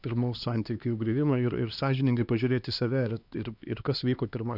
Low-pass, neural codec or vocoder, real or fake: 5.4 kHz; codec, 16 kHz, 2 kbps, X-Codec, HuBERT features, trained on LibriSpeech; fake